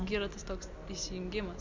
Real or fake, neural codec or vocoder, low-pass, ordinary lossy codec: real; none; 7.2 kHz; MP3, 64 kbps